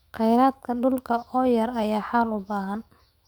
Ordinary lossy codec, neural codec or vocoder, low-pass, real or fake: Opus, 64 kbps; autoencoder, 48 kHz, 128 numbers a frame, DAC-VAE, trained on Japanese speech; 19.8 kHz; fake